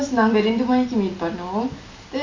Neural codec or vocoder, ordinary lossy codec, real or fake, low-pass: none; MP3, 32 kbps; real; 7.2 kHz